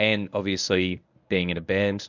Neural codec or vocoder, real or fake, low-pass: codec, 24 kHz, 0.9 kbps, WavTokenizer, medium speech release version 1; fake; 7.2 kHz